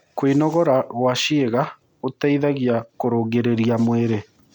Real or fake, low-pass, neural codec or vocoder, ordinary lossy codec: real; 19.8 kHz; none; none